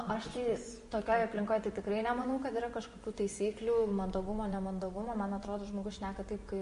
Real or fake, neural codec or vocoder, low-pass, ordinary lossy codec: fake; vocoder, 24 kHz, 100 mel bands, Vocos; 10.8 kHz; MP3, 48 kbps